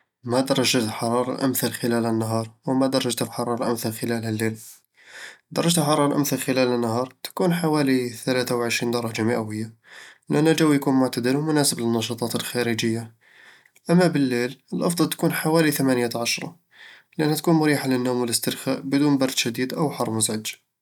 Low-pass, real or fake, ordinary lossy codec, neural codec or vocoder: 19.8 kHz; real; none; none